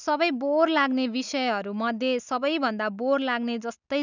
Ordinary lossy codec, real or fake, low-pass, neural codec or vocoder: none; real; 7.2 kHz; none